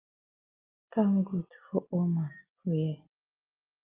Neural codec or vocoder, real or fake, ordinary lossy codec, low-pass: none; real; Opus, 32 kbps; 3.6 kHz